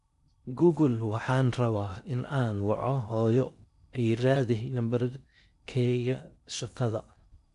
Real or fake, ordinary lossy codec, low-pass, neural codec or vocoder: fake; none; 10.8 kHz; codec, 16 kHz in and 24 kHz out, 0.6 kbps, FocalCodec, streaming, 4096 codes